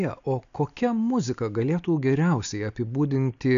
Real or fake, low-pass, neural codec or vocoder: real; 7.2 kHz; none